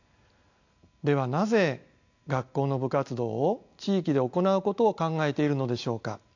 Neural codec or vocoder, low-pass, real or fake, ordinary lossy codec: none; 7.2 kHz; real; AAC, 48 kbps